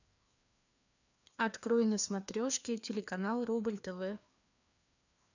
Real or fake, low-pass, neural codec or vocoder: fake; 7.2 kHz; codec, 16 kHz, 2 kbps, FreqCodec, larger model